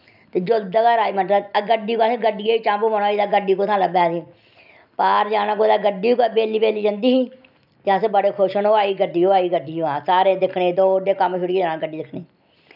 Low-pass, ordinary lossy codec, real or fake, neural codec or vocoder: 5.4 kHz; none; real; none